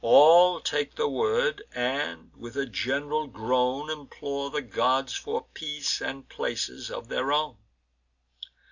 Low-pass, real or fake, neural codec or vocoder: 7.2 kHz; real; none